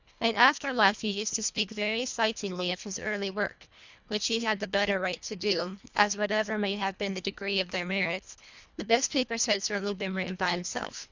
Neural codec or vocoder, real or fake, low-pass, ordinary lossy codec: codec, 24 kHz, 1.5 kbps, HILCodec; fake; 7.2 kHz; Opus, 64 kbps